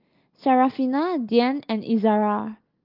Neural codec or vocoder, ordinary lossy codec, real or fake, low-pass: none; Opus, 32 kbps; real; 5.4 kHz